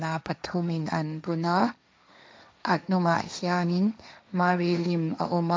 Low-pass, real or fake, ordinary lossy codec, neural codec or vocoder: none; fake; none; codec, 16 kHz, 1.1 kbps, Voila-Tokenizer